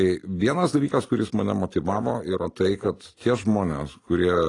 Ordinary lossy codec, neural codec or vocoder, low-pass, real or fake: AAC, 32 kbps; none; 10.8 kHz; real